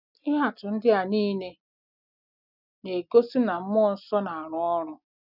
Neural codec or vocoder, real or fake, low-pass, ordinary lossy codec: none; real; 5.4 kHz; none